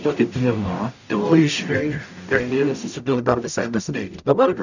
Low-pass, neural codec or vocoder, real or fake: 7.2 kHz; codec, 44.1 kHz, 0.9 kbps, DAC; fake